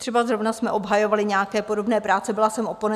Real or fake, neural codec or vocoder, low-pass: real; none; 14.4 kHz